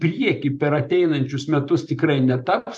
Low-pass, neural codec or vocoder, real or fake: 10.8 kHz; vocoder, 44.1 kHz, 128 mel bands every 512 samples, BigVGAN v2; fake